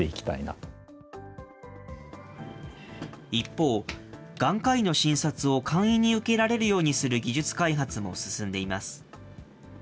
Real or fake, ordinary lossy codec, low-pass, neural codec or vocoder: real; none; none; none